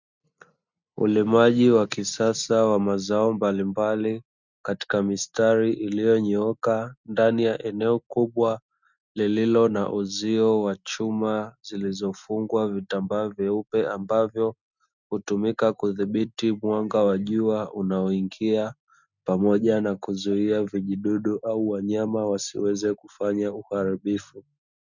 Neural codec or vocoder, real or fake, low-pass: none; real; 7.2 kHz